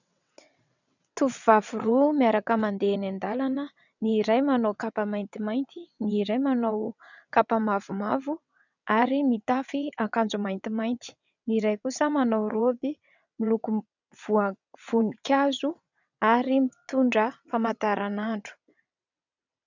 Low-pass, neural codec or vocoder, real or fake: 7.2 kHz; vocoder, 22.05 kHz, 80 mel bands, WaveNeXt; fake